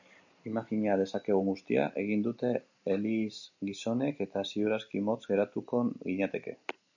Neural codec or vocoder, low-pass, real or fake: none; 7.2 kHz; real